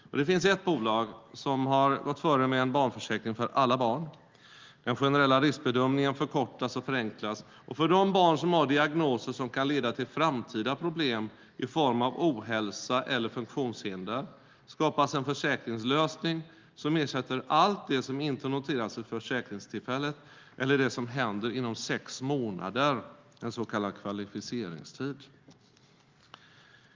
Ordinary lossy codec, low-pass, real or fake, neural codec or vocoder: Opus, 32 kbps; 7.2 kHz; real; none